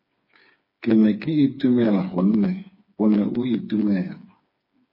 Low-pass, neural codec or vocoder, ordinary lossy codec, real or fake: 5.4 kHz; codec, 16 kHz, 4 kbps, FreqCodec, smaller model; MP3, 24 kbps; fake